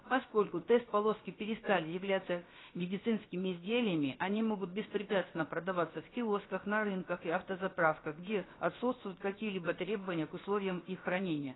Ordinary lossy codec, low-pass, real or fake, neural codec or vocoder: AAC, 16 kbps; 7.2 kHz; fake; codec, 16 kHz, about 1 kbps, DyCAST, with the encoder's durations